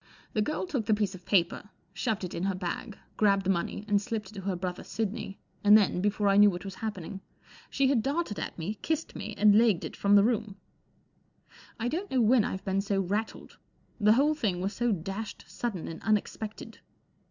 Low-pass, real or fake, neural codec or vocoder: 7.2 kHz; real; none